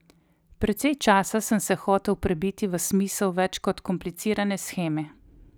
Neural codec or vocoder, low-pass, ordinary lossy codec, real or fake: none; none; none; real